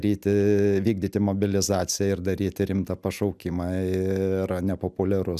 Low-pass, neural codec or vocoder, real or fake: 14.4 kHz; none; real